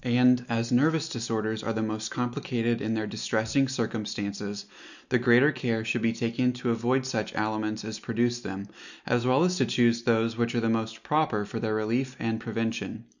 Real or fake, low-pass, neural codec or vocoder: real; 7.2 kHz; none